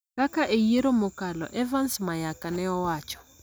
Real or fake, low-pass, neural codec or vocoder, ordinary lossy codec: real; none; none; none